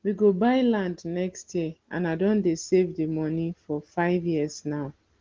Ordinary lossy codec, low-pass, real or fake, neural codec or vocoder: Opus, 16 kbps; 7.2 kHz; real; none